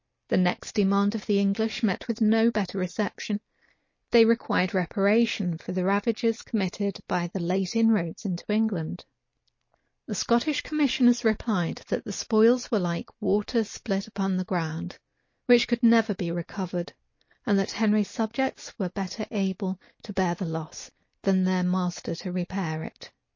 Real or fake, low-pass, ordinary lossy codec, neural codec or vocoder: real; 7.2 kHz; MP3, 32 kbps; none